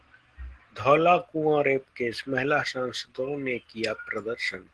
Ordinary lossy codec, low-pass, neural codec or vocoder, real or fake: Opus, 16 kbps; 10.8 kHz; none; real